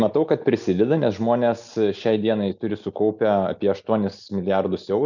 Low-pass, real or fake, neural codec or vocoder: 7.2 kHz; real; none